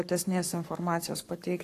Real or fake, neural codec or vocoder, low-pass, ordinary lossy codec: fake; codec, 44.1 kHz, 7.8 kbps, Pupu-Codec; 14.4 kHz; AAC, 64 kbps